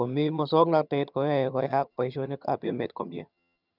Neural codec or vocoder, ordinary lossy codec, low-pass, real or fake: vocoder, 22.05 kHz, 80 mel bands, HiFi-GAN; none; 5.4 kHz; fake